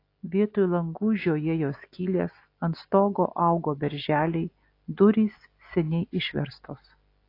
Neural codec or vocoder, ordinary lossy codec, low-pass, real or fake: none; AAC, 32 kbps; 5.4 kHz; real